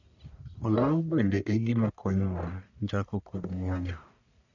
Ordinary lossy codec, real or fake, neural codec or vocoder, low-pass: none; fake; codec, 44.1 kHz, 1.7 kbps, Pupu-Codec; 7.2 kHz